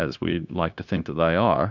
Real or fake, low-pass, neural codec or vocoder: fake; 7.2 kHz; codec, 16 kHz, 0.9 kbps, LongCat-Audio-Codec